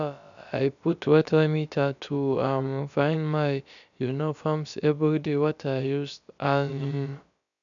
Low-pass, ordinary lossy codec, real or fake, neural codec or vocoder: 7.2 kHz; none; fake; codec, 16 kHz, about 1 kbps, DyCAST, with the encoder's durations